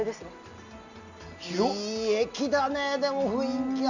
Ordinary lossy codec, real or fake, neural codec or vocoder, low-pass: none; real; none; 7.2 kHz